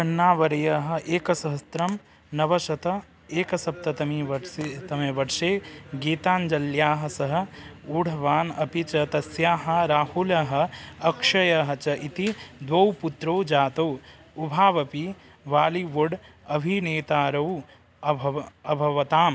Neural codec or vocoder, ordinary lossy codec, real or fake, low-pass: none; none; real; none